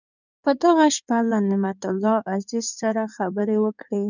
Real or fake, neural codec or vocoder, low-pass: fake; codec, 16 kHz in and 24 kHz out, 2.2 kbps, FireRedTTS-2 codec; 7.2 kHz